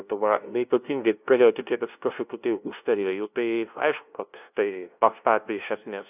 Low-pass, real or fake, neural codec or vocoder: 3.6 kHz; fake; codec, 16 kHz, 0.5 kbps, FunCodec, trained on LibriTTS, 25 frames a second